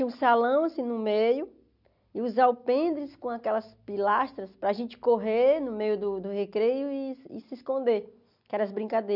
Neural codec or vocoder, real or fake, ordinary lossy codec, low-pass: none; real; none; 5.4 kHz